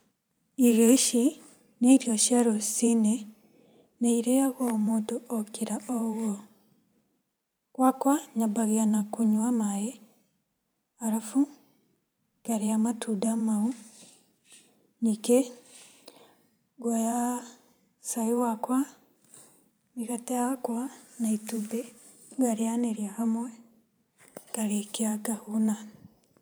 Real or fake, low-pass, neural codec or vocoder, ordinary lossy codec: fake; none; vocoder, 44.1 kHz, 128 mel bands every 512 samples, BigVGAN v2; none